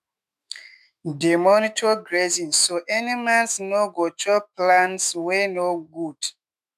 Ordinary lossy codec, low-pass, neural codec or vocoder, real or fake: none; 14.4 kHz; autoencoder, 48 kHz, 128 numbers a frame, DAC-VAE, trained on Japanese speech; fake